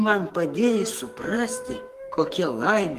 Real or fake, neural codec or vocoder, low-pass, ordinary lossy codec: fake; codec, 44.1 kHz, 2.6 kbps, SNAC; 14.4 kHz; Opus, 24 kbps